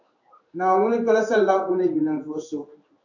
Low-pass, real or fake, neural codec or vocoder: 7.2 kHz; fake; codec, 16 kHz in and 24 kHz out, 1 kbps, XY-Tokenizer